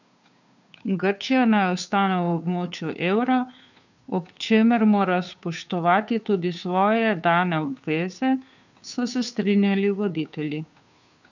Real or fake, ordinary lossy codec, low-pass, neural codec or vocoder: fake; none; 7.2 kHz; codec, 16 kHz, 2 kbps, FunCodec, trained on Chinese and English, 25 frames a second